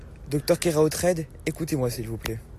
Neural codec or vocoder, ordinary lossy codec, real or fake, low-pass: none; AAC, 64 kbps; real; 14.4 kHz